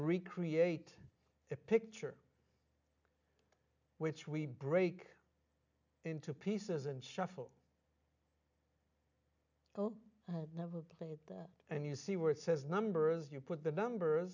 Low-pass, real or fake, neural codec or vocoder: 7.2 kHz; real; none